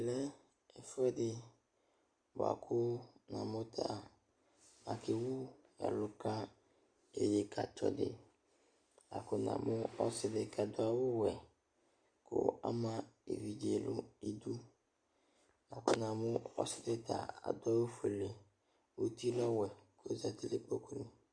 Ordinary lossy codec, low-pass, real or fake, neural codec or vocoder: Opus, 64 kbps; 9.9 kHz; real; none